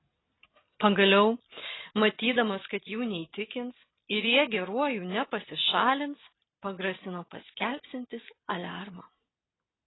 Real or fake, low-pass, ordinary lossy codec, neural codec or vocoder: real; 7.2 kHz; AAC, 16 kbps; none